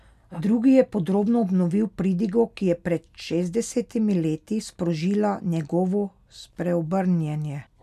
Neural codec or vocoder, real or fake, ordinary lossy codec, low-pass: none; real; none; 14.4 kHz